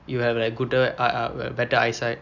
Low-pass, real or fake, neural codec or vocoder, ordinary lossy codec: 7.2 kHz; real; none; none